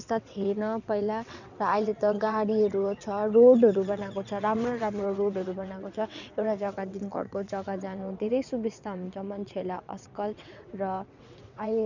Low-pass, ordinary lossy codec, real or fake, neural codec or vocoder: 7.2 kHz; none; fake; vocoder, 22.05 kHz, 80 mel bands, WaveNeXt